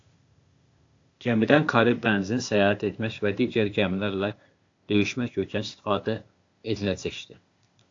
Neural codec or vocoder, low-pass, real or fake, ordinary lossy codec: codec, 16 kHz, 0.8 kbps, ZipCodec; 7.2 kHz; fake; AAC, 48 kbps